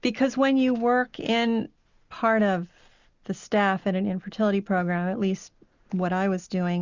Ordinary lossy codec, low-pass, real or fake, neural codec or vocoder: Opus, 64 kbps; 7.2 kHz; real; none